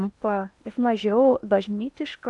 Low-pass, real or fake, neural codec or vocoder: 10.8 kHz; fake; codec, 16 kHz in and 24 kHz out, 0.8 kbps, FocalCodec, streaming, 65536 codes